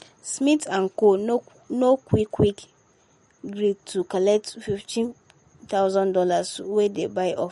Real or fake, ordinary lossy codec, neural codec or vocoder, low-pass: real; MP3, 48 kbps; none; 19.8 kHz